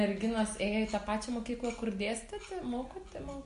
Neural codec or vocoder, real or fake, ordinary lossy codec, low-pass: none; real; MP3, 48 kbps; 10.8 kHz